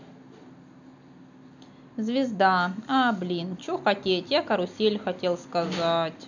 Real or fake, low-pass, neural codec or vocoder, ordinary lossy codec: real; 7.2 kHz; none; none